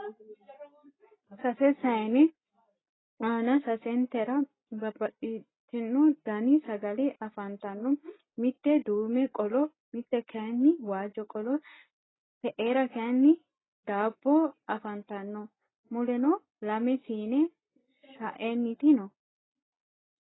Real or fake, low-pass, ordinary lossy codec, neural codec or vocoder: real; 7.2 kHz; AAC, 16 kbps; none